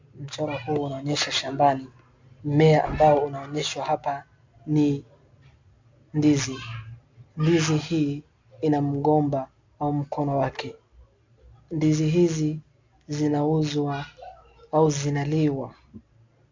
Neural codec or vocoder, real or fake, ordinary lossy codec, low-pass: none; real; MP3, 64 kbps; 7.2 kHz